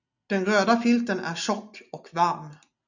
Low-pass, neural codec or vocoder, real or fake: 7.2 kHz; none; real